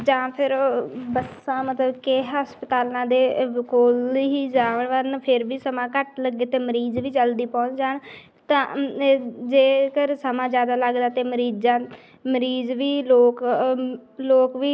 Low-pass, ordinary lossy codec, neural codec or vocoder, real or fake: none; none; none; real